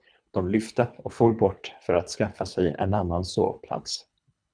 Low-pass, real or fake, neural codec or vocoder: 9.9 kHz; fake; codec, 24 kHz, 3 kbps, HILCodec